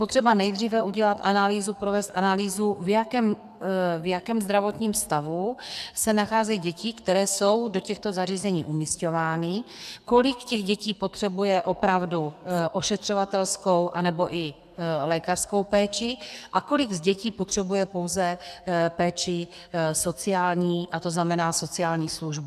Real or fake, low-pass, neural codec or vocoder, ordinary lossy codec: fake; 14.4 kHz; codec, 44.1 kHz, 2.6 kbps, SNAC; AAC, 96 kbps